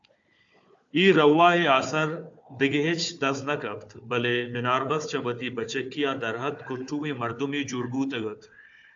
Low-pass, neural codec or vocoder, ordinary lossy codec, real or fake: 7.2 kHz; codec, 16 kHz, 4 kbps, FunCodec, trained on Chinese and English, 50 frames a second; AAC, 64 kbps; fake